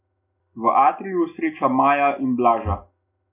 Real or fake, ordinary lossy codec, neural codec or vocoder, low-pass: real; none; none; 3.6 kHz